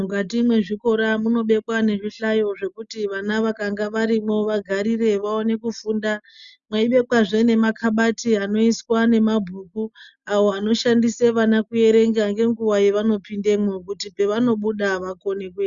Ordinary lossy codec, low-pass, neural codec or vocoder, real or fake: Opus, 64 kbps; 7.2 kHz; none; real